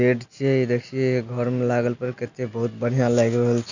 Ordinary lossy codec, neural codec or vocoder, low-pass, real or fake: none; none; 7.2 kHz; real